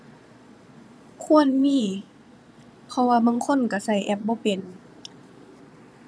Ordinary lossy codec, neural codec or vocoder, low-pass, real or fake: none; vocoder, 22.05 kHz, 80 mel bands, Vocos; none; fake